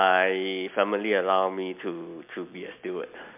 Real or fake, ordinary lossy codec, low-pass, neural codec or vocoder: real; MP3, 32 kbps; 3.6 kHz; none